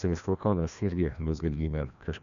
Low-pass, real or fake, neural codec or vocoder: 7.2 kHz; fake; codec, 16 kHz, 1 kbps, FreqCodec, larger model